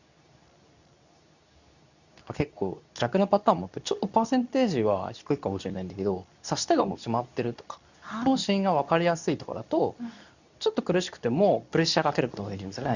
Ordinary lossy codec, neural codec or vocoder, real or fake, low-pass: none; codec, 24 kHz, 0.9 kbps, WavTokenizer, medium speech release version 2; fake; 7.2 kHz